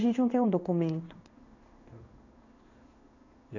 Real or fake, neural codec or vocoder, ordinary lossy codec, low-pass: fake; codec, 16 kHz in and 24 kHz out, 1 kbps, XY-Tokenizer; none; 7.2 kHz